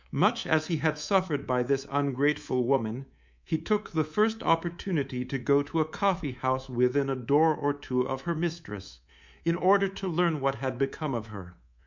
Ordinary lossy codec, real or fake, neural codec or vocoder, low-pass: MP3, 64 kbps; fake; codec, 24 kHz, 3.1 kbps, DualCodec; 7.2 kHz